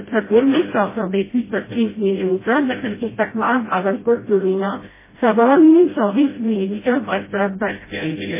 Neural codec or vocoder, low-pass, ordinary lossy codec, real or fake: codec, 16 kHz, 0.5 kbps, FreqCodec, smaller model; 3.6 kHz; MP3, 16 kbps; fake